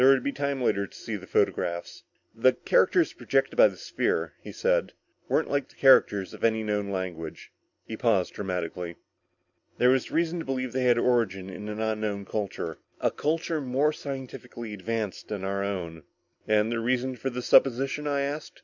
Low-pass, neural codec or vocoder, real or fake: 7.2 kHz; none; real